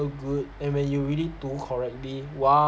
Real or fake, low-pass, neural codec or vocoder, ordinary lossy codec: real; none; none; none